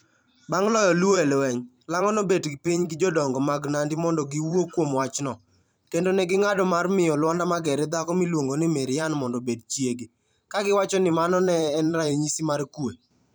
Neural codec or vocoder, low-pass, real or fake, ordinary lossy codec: vocoder, 44.1 kHz, 128 mel bands every 512 samples, BigVGAN v2; none; fake; none